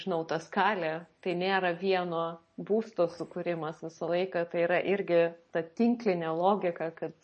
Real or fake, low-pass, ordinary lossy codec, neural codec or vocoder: real; 10.8 kHz; MP3, 32 kbps; none